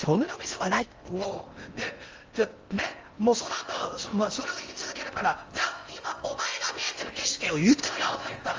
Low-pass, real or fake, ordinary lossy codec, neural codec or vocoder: 7.2 kHz; fake; Opus, 32 kbps; codec, 16 kHz in and 24 kHz out, 0.6 kbps, FocalCodec, streaming, 4096 codes